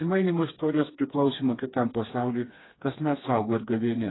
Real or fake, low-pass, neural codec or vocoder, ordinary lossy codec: fake; 7.2 kHz; codec, 16 kHz, 2 kbps, FreqCodec, smaller model; AAC, 16 kbps